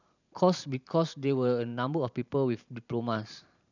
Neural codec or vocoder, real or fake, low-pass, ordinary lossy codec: vocoder, 44.1 kHz, 128 mel bands every 512 samples, BigVGAN v2; fake; 7.2 kHz; none